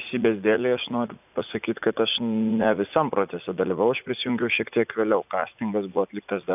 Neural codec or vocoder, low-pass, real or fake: vocoder, 44.1 kHz, 80 mel bands, Vocos; 3.6 kHz; fake